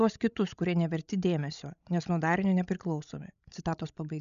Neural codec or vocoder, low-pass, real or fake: codec, 16 kHz, 16 kbps, FreqCodec, larger model; 7.2 kHz; fake